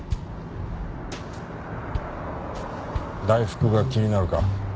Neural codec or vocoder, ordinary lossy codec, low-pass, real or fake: none; none; none; real